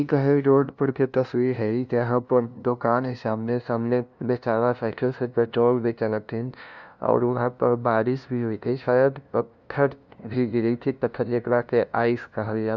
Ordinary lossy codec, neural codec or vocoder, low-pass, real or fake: none; codec, 16 kHz, 0.5 kbps, FunCodec, trained on LibriTTS, 25 frames a second; 7.2 kHz; fake